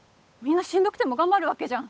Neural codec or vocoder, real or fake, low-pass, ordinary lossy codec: codec, 16 kHz, 8 kbps, FunCodec, trained on Chinese and English, 25 frames a second; fake; none; none